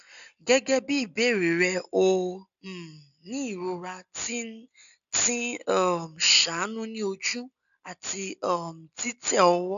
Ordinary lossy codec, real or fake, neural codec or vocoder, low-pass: none; real; none; 7.2 kHz